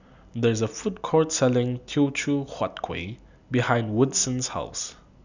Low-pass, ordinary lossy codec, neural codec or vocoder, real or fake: 7.2 kHz; none; none; real